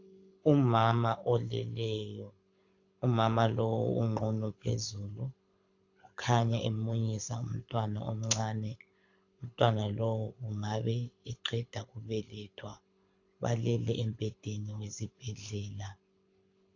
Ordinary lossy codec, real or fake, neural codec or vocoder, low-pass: AAC, 48 kbps; fake; codec, 24 kHz, 6 kbps, HILCodec; 7.2 kHz